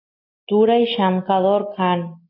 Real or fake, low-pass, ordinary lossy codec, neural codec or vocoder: real; 5.4 kHz; AAC, 32 kbps; none